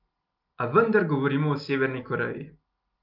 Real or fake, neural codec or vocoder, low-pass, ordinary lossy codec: real; none; 5.4 kHz; Opus, 24 kbps